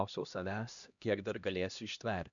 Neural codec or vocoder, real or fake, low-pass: codec, 16 kHz, 1 kbps, X-Codec, HuBERT features, trained on LibriSpeech; fake; 7.2 kHz